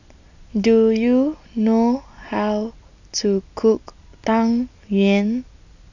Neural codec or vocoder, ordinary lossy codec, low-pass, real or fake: none; none; 7.2 kHz; real